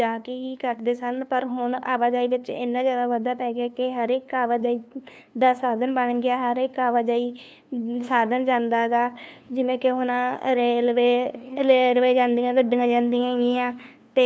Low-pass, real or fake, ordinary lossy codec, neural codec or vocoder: none; fake; none; codec, 16 kHz, 2 kbps, FunCodec, trained on LibriTTS, 25 frames a second